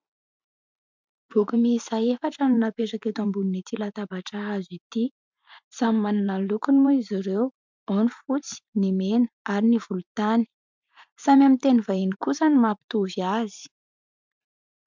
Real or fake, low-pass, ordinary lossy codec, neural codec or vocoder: fake; 7.2 kHz; MP3, 64 kbps; vocoder, 44.1 kHz, 128 mel bands every 512 samples, BigVGAN v2